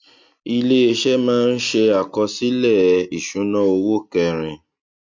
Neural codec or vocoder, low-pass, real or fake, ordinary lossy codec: none; 7.2 kHz; real; MP3, 48 kbps